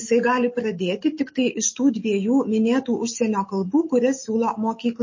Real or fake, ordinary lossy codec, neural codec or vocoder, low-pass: real; MP3, 32 kbps; none; 7.2 kHz